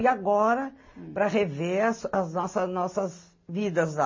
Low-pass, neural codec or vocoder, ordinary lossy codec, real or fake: 7.2 kHz; none; MP3, 32 kbps; real